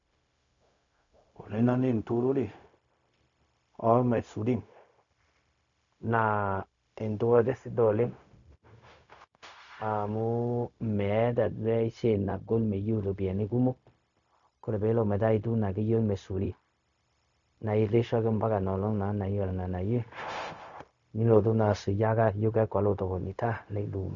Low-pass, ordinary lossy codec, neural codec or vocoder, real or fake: 7.2 kHz; none; codec, 16 kHz, 0.4 kbps, LongCat-Audio-Codec; fake